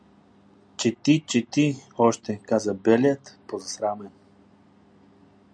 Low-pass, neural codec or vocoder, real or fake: 9.9 kHz; none; real